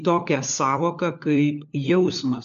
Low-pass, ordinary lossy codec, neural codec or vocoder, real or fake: 7.2 kHz; AAC, 64 kbps; codec, 16 kHz, 4 kbps, FunCodec, trained on LibriTTS, 50 frames a second; fake